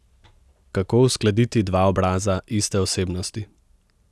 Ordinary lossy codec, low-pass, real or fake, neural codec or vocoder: none; none; real; none